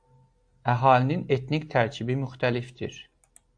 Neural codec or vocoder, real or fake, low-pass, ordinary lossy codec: none; real; 9.9 kHz; AAC, 64 kbps